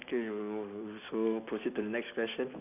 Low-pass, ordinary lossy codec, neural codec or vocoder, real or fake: 3.6 kHz; none; none; real